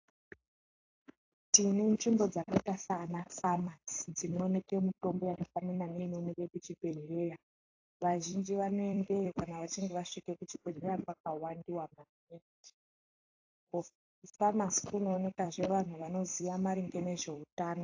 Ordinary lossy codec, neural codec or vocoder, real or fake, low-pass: AAC, 48 kbps; vocoder, 44.1 kHz, 128 mel bands every 256 samples, BigVGAN v2; fake; 7.2 kHz